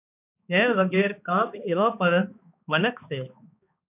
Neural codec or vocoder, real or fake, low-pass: codec, 16 kHz, 2 kbps, X-Codec, HuBERT features, trained on balanced general audio; fake; 3.6 kHz